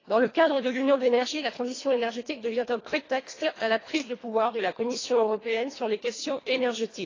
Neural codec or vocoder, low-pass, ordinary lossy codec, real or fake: codec, 24 kHz, 1.5 kbps, HILCodec; 7.2 kHz; AAC, 32 kbps; fake